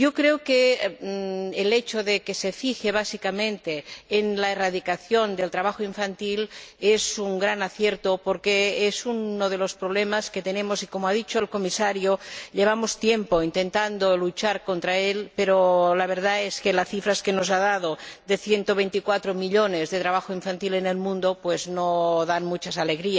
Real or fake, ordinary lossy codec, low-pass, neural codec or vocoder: real; none; none; none